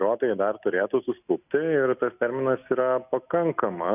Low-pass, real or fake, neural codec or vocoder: 3.6 kHz; real; none